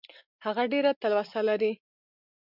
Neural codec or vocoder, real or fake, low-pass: none; real; 5.4 kHz